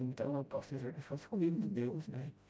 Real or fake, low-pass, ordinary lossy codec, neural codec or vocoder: fake; none; none; codec, 16 kHz, 0.5 kbps, FreqCodec, smaller model